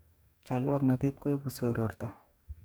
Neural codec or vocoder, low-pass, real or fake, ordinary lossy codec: codec, 44.1 kHz, 2.6 kbps, DAC; none; fake; none